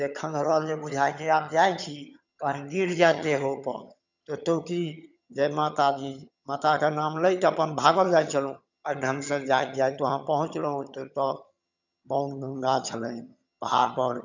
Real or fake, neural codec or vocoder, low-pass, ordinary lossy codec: fake; vocoder, 22.05 kHz, 80 mel bands, HiFi-GAN; 7.2 kHz; none